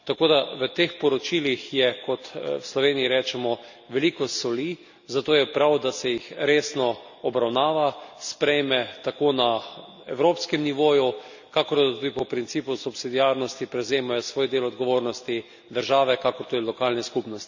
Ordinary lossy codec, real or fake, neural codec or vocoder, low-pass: none; real; none; 7.2 kHz